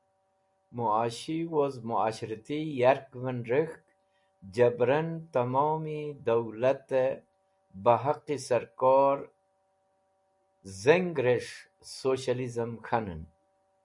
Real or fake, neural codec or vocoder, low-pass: real; none; 10.8 kHz